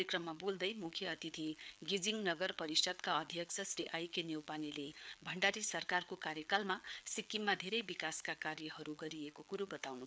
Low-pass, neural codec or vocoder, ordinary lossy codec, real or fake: none; codec, 16 kHz, 4 kbps, FreqCodec, larger model; none; fake